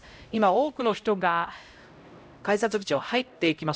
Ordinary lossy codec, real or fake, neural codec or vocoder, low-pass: none; fake; codec, 16 kHz, 0.5 kbps, X-Codec, HuBERT features, trained on LibriSpeech; none